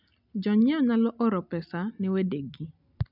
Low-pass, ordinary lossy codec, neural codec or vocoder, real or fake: 5.4 kHz; none; none; real